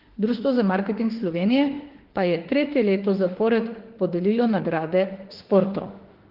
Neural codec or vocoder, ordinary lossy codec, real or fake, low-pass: autoencoder, 48 kHz, 32 numbers a frame, DAC-VAE, trained on Japanese speech; Opus, 16 kbps; fake; 5.4 kHz